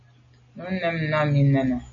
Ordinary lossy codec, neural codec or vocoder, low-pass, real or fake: MP3, 32 kbps; none; 7.2 kHz; real